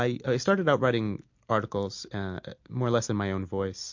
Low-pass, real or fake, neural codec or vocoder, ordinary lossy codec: 7.2 kHz; real; none; MP3, 48 kbps